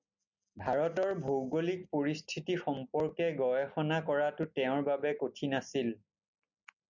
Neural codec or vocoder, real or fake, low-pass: none; real; 7.2 kHz